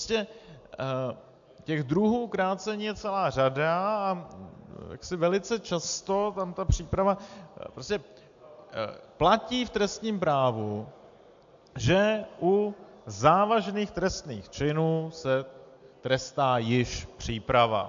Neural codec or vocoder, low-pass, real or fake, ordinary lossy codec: none; 7.2 kHz; real; AAC, 64 kbps